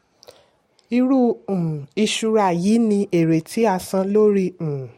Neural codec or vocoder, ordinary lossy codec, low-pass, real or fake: none; MP3, 64 kbps; 19.8 kHz; real